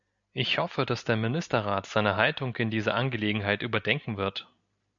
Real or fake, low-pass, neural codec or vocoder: real; 7.2 kHz; none